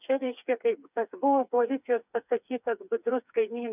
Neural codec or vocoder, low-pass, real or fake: codec, 16 kHz, 4 kbps, FreqCodec, smaller model; 3.6 kHz; fake